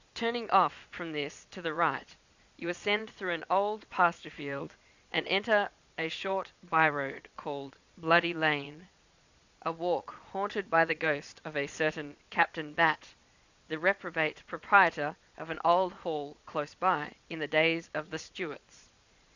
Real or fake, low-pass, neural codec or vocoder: fake; 7.2 kHz; vocoder, 22.05 kHz, 80 mel bands, Vocos